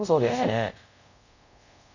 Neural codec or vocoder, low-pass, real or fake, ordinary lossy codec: codec, 24 kHz, 0.5 kbps, DualCodec; 7.2 kHz; fake; none